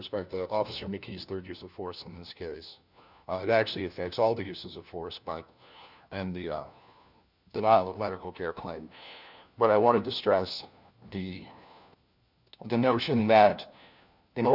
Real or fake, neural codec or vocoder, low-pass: fake; codec, 16 kHz, 1 kbps, FunCodec, trained on LibriTTS, 50 frames a second; 5.4 kHz